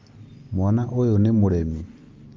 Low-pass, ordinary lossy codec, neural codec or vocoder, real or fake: 7.2 kHz; Opus, 24 kbps; none; real